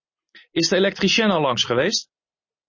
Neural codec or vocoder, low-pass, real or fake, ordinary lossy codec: none; 7.2 kHz; real; MP3, 32 kbps